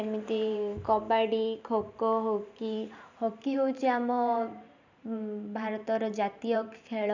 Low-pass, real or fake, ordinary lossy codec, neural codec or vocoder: 7.2 kHz; fake; none; vocoder, 44.1 kHz, 128 mel bands every 512 samples, BigVGAN v2